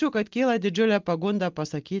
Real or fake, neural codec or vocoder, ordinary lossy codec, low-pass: real; none; Opus, 24 kbps; 7.2 kHz